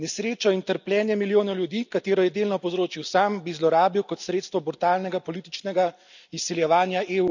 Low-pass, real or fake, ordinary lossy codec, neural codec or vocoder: 7.2 kHz; real; none; none